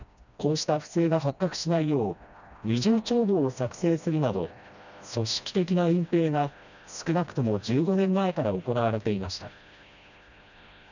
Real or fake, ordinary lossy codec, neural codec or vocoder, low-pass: fake; none; codec, 16 kHz, 1 kbps, FreqCodec, smaller model; 7.2 kHz